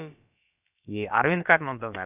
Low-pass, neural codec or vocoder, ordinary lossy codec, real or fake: 3.6 kHz; codec, 16 kHz, about 1 kbps, DyCAST, with the encoder's durations; none; fake